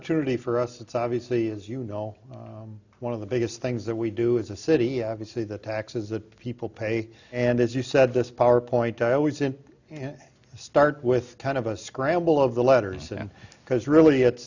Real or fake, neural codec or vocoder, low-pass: real; none; 7.2 kHz